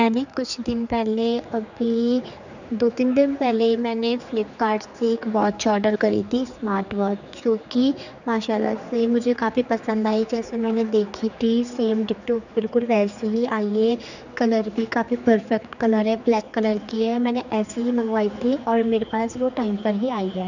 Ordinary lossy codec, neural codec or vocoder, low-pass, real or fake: none; codec, 16 kHz, 4 kbps, X-Codec, HuBERT features, trained on general audio; 7.2 kHz; fake